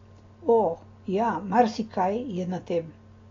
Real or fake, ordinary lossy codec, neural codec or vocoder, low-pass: real; AAC, 32 kbps; none; 7.2 kHz